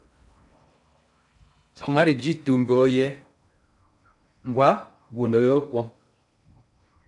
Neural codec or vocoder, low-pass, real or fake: codec, 16 kHz in and 24 kHz out, 0.6 kbps, FocalCodec, streaming, 4096 codes; 10.8 kHz; fake